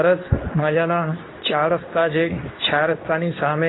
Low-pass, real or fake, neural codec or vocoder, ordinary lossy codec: 7.2 kHz; fake; codec, 24 kHz, 0.9 kbps, WavTokenizer, medium speech release version 2; AAC, 16 kbps